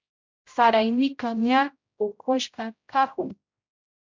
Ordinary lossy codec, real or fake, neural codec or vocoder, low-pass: MP3, 64 kbps; fake; codec, 16 kHz, 0.5 kbps, X-Codec, HuBERT features, trained on general audio; 7.2 kHz